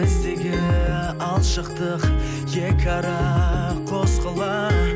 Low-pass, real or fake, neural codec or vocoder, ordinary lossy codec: none; real; none; none